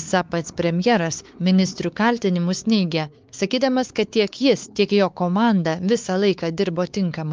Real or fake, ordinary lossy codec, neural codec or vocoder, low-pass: fake; Opus, 24 kbps; codec, 16 kHz, 4 kbps, X-Codec, WavLM features, trained on Multilingual LibriSpeech; 7.2 kHz